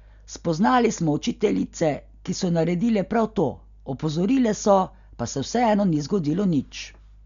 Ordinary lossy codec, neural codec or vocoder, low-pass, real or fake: none; none; 7.2 kHz; real